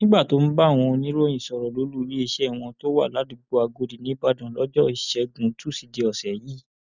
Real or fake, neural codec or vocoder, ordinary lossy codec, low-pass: real; none; none; 7.2 kHz